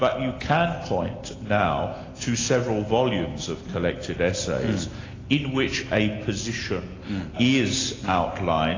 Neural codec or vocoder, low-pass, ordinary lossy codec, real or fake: none; 7.2 kHz; AAC, 32 kbps; real